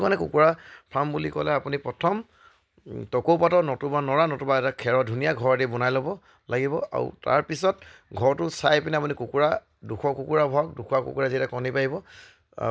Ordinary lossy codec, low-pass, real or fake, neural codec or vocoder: none; none; real; none